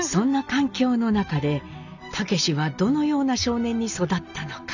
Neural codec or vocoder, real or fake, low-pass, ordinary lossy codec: none; real; 7.2 kHz; none